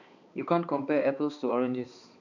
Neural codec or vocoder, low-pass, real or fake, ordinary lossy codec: codec, 16 kHz, 2 kbps, X-Codec, HuBERT features, trained on balanced general audio; 7.2 kHz; fake; none